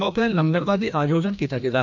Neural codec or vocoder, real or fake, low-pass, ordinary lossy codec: codec, 16 kHz, 1 kbps, FreqCodec, larger model; fake; 7.2 kHz; none